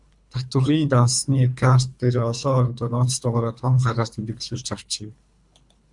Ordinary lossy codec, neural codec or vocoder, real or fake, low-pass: MP3, 96 kbps; codec, 24 kHz, 3 kbps, HILCodec; fake; 10.8 kHz